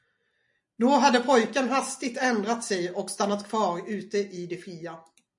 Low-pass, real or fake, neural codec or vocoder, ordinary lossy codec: 10.8 kHz; real; none; MP3, 48 kbps